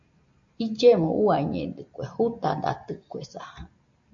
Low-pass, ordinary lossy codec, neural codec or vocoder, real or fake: 7.2 kHz; AAC, 64 kbps; none; real